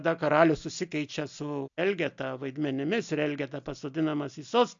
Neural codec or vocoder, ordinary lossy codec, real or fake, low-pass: none; AAC, 64 kbps; real; 7.2 kHz